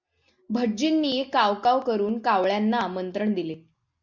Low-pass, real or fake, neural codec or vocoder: 7.2 kHz; real; none